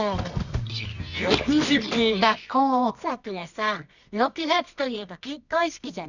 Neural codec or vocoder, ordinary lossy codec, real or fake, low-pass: codec, 24 kHz, 0.9 kbps, WavTokenizer, medium music audio release; none; fake; 7.2 kHz